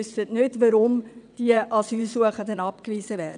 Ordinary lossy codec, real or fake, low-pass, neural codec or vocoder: none; fake; 9.9 kHz; vocoder, 22.05 kHz, 80 mel bands, WaveNeXt